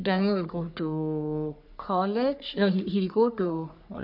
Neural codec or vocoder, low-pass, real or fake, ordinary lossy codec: codec, 44.1 kHz, 3.4 kbps, Pupu-Codec; 5.4 kHz; fake; none